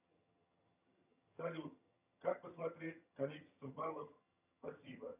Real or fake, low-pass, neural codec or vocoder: fake; 3.6 kHz; vocoder, 22.05 kHz, 80 mel bands, HiFi-GAN